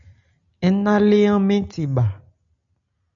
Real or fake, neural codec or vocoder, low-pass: real; none; 7.2 kHz